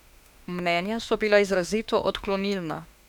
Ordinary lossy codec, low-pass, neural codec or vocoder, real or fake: none; 19.8 kHz; autoencoder, 48 kHz, 32 numbers a frame, DAC-VAE, trained on Japanese speech; fake